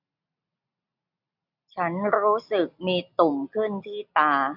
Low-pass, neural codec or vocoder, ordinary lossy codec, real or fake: 5.4 kHz; none; none; real